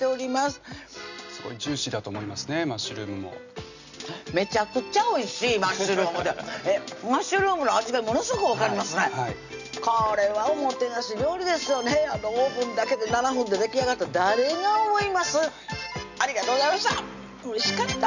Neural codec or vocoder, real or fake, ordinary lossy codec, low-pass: none; real; none; 7.2 kHz